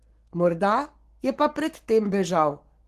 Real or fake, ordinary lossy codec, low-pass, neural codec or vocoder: fake; Opus, 16 kbps; 14.4 kHz; codec, 44.1 kHz, 7.8 kbps, Pupu-Codec